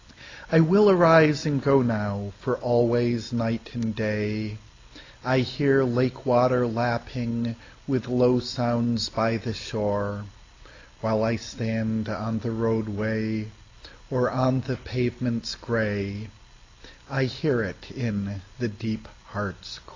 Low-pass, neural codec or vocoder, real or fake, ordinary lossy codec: 7.2 kHz; none; real; AAC, 32 kbps